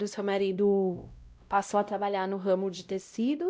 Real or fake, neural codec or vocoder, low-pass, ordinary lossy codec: fake; codec, 16 kHz, 0.5 kbps, X-Codec, WavLM features, trained on Multilingual LibriSpeech; none; none